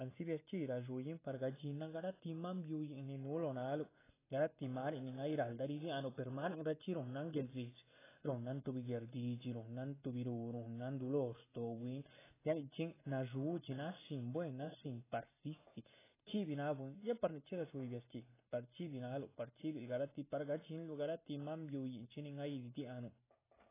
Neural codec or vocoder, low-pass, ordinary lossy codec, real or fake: none; 3.6 kHz; AAC, 16 kbps; real